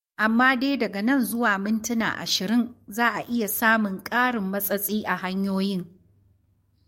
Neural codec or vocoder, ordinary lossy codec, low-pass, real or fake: codec, 44.1 kHz, 7.8 kbps, DAC; MP3, 64 kbps; 19.8 kHz; fake